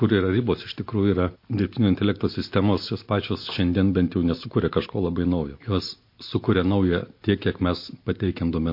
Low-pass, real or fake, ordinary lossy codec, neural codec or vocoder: 5.4 kHz; real; MP3, 32 kbps; none